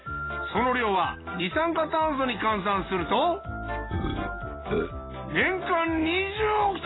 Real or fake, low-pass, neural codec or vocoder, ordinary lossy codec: real; 7.2 kHz; none; AAC, 16 kbps